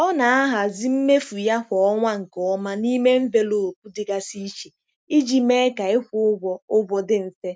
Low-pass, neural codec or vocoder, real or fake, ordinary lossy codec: none; none; real; none